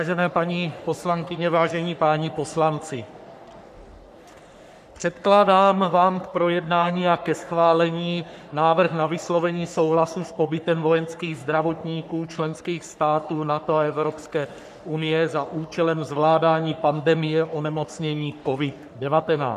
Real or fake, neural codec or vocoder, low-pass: fake; codec, 44.1 kHz, 3.4 kbps, Pupu-Codec; 14.4 kHz